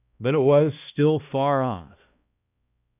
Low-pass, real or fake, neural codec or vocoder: 3.6 kHz; fake; codec, 16 kHz, 1 kbps, X-Codec, HuBERT features, trained on balanced general audio